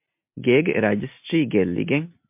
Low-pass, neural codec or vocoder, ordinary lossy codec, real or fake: 3.6 kHz; none; MP3, 32 kbps; real